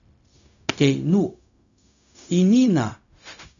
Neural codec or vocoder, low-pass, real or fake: codec, 16 kHz, 0.4 kbps, LongCat-Audio-Codec; 7.2 kHz; fake